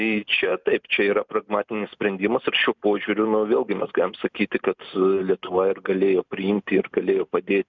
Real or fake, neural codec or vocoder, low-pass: real; none; 7.2 kHz